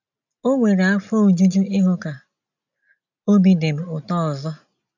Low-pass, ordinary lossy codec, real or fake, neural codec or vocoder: 7.2 kHz; none; real; none